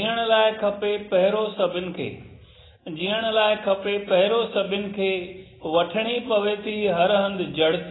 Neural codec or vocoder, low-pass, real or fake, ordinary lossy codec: none; 7.2 kHz; real; AAC, 16 kbps